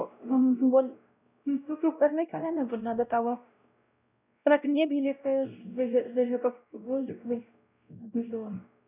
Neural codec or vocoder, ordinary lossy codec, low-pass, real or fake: codec, 16 kHz, 0.5 kbps, X-Codec, WavLM features, trained on Multilingual LibriSpeech; none; 3.6 kHz; fake